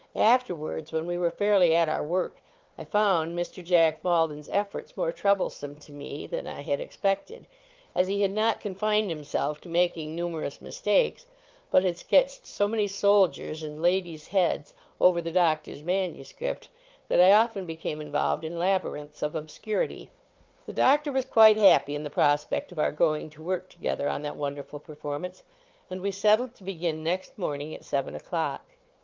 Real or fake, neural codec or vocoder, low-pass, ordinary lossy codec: fake; codec, 16 kHz, 4 kbps, FunCodec, trained on Chinese and English, 50 frames a second; 7.2 kHz; Opus, 32 kbps